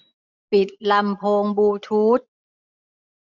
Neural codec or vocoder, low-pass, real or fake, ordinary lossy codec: none; 7.2 kHz; real; none